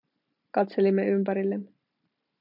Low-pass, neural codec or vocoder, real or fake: 5.4 kHz; none; real